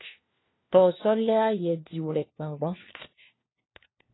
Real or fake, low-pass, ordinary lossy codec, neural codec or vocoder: fake; 7.2 kHz; AAC, 16 kbps; codec, 16 kHz, 1 kbps, FunCodec, trained on LibriTTS, 50 frames a second